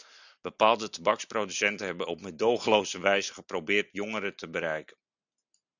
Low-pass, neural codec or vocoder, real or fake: 7.2 kHz; none; real